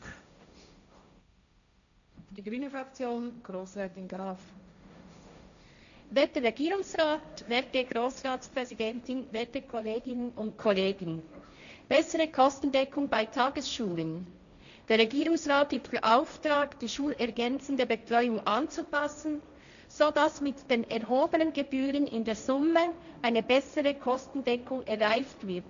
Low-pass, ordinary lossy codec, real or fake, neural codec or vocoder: 7.2 kHz; none; fake; codec, 16 kHz, 1.1 kbps, Voila-Tokenizer